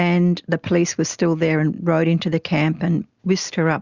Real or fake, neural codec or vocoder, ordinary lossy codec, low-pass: real; none; Opus, 64 kbps; 7.2 kHz